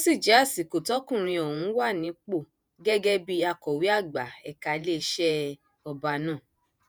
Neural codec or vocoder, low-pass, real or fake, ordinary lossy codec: vocoder, 48 kHz, 128 mel bands, Vocos; none; fake; none